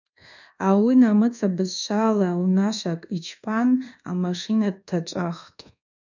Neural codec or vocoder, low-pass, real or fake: codec, 24 kHz, 1.2 kbps, DualCodec; 7.2 kHz; fake